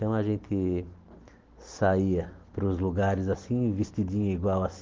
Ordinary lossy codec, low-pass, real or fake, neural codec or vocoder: Opus, 16 kbps; 7.2 kHz; real; none